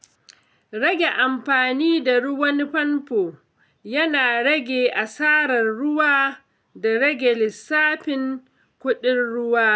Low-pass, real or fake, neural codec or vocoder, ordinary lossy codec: none; real; none; none